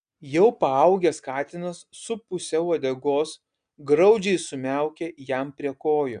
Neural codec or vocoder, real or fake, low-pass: none; real; 9.9 kHz